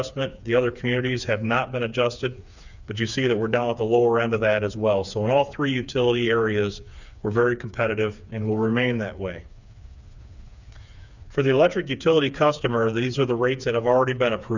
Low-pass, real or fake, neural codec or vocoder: 7.2 kHz; fake; codec, 16 kHz, 4 kbps, FreqCodec, smaller model